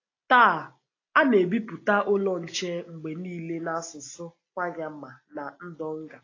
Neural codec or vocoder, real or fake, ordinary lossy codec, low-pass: none; real; AAC, 32 kbps; 7.2 kHz